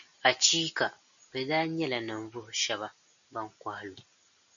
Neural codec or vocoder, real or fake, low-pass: none; real; 7.2 kHz